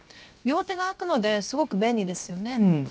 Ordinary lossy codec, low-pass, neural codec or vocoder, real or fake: none; none; codec, 16 kHz, 0.7 kbps, FocalCodec; fake